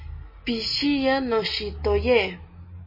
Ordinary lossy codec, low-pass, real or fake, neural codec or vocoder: MP3, 24 kbps; 5.4 kHz; real; none